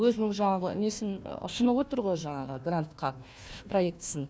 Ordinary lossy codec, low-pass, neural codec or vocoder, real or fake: none; none; codec, 16 kHz, 1 kbps, FunCodec, trained on Chinese and English, 50 frames a second; fake